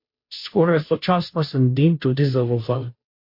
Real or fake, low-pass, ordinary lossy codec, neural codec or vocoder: fake; 5.4 kHz; MP3, 32 kbps; codec, 16 kHz, 0.5 kbps, FunCodec, trained on Chinese and English, 25 frames a second